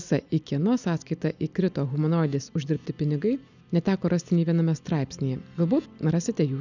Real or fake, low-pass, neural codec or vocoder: real; 7.2 kHz; none